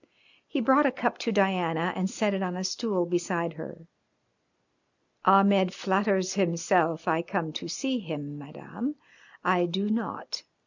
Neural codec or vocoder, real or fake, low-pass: none; real; 7.2 kHz